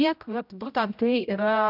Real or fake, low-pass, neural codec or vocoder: fake; 5.4 kHz; codec, 16 kHz, 0.5 kbps, X-Codec, HuBERT features, trained on general audio